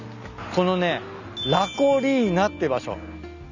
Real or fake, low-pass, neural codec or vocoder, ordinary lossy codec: real; 7.2 kHz; none; none